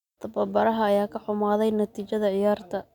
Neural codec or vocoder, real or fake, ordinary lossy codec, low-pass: none; real; none; 19.8 kHz